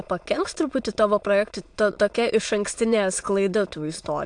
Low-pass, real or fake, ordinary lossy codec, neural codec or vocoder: 9.9 kHz; fake; AAC, 64 kbps; autoencoder, 22.05 kHz, a latent of 192 numbers a frame, VITS, trained on many speakers